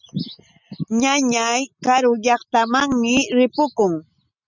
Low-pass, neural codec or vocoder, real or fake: 7.2 kHz; none; real